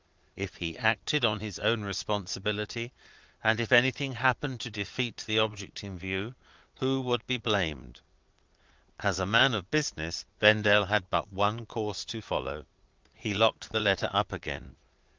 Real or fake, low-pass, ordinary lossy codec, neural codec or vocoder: fake; 7.2 kHz; Opus, 24 kbps; vocoder, 22.05 kHz, 80 mel bands, Vocos